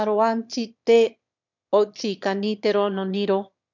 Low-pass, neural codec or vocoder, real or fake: 7.2 kHz; autoencoder, 22.05 kHz, a latent of 192 numbers a frame, VITS, trained on one speaker; fake